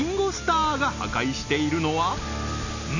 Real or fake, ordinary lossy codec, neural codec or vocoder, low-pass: real; none; none; 7.2 kHz